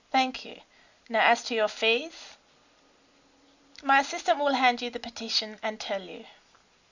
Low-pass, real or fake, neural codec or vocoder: 7.2 kHz; real; none